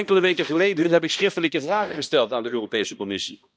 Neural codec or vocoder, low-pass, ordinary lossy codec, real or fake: codec, 16 kHz, 1 kbps, X-Codec, HuBERT features, trained on balanced general audio; none; none; fake